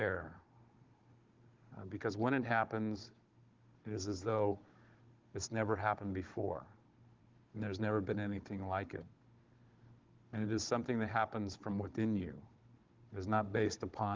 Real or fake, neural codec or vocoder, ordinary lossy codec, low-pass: fake; codec, 16 kHz, 16 kbps, FunCodec, trained on Chinese and English, 50 frames a second; Opus, 16 kbps; 7.2 kHz